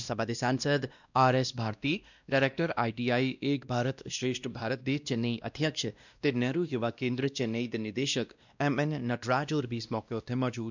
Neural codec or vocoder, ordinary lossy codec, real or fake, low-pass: codec, 16 kHz, 1 kbps, X-Codec, WavLM features, trained on Multilingual LibriSpeech; none; fake; 7.2 kHz